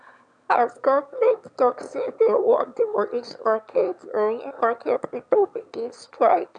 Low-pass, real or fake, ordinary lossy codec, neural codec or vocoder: 9.9 kHz; fake; none; autoencoder, 22.05 kHz, a latent of 192 numbers a frame, VITS, trained on one speaker